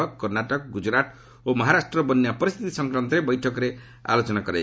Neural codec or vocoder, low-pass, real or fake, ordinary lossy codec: none; none; real; none